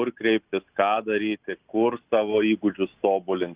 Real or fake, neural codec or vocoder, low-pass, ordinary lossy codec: real; none; 3.6 kHz; Opus, 64 kbps